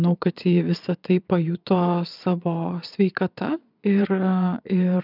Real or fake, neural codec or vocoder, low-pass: fake; vocoder, 22.05 kHz, 80 mel bands, Vocos; 5.4 kHz